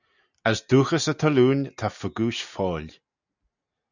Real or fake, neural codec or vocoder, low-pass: real; none; 7.2 kHz